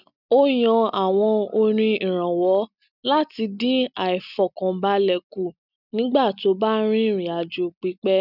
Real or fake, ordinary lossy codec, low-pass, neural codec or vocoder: real; none; 5.4 kHz; none